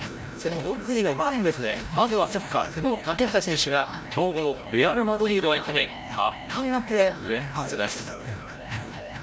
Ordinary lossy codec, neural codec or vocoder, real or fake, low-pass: none; codec, 16 kHz, 0.5 kbps, FreqCodec, larger model; fake; none